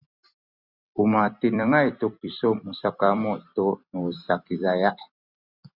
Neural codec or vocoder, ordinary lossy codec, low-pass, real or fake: vocoder, 44.1 kHz, 128 mel bands every 256 samples, BigVGAN v2; Opus, 64 kbps; 5.4 kHz; fake